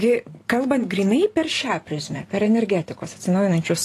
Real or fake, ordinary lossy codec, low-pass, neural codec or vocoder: fake; AAC, 48 kbps; 14.4 kHz; vocoder, 44.1 kHz, 128 mel bands every 512 samples, BigVGAN v2